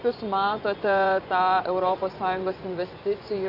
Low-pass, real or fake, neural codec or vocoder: 5.4 kHz; fake; vocoder, 24 kHz, 100 mel bands, Vocos